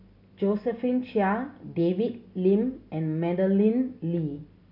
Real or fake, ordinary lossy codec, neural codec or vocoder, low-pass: real; AAC, 48 kbps; none; 5.4 kHz